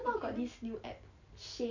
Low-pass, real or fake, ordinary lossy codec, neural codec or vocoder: 7.2 kHz; real; none; none